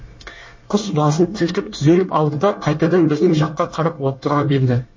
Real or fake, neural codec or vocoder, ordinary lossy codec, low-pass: fake; codec, 24 kHz, 1 kbps, SNAC; MP3, 32 kbps; 7.2 kHz